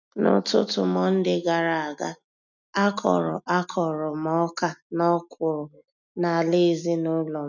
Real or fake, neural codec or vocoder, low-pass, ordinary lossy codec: real; none; 7.2 kHz; none